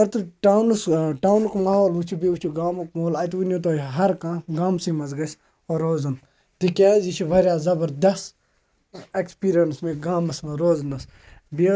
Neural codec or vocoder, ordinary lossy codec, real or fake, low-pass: none; none; real; none